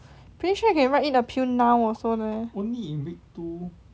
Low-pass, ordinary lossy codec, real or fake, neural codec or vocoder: none; none; real; none